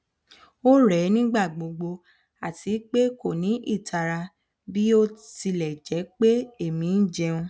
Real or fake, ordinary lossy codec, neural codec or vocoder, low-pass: real; none; none; none